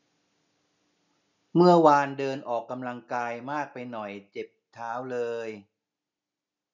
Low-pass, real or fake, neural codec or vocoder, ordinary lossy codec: 7.2 kHz; real; none; none